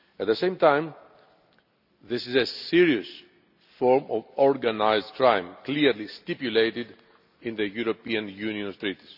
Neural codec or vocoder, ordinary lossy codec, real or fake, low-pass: none; none; real; 5.4 kHz